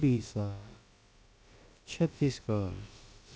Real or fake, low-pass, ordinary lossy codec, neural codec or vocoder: fake; none; none; codec, 16 kHz, about 1 kbps, DyCAST, with the encoder's durations